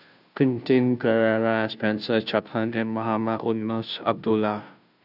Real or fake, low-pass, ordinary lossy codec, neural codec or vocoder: fake; 5.4 kHz; none; codec, 16 kHz, 0.5 kbps, FunCodec, trained on Chinese and English, 25 frames a second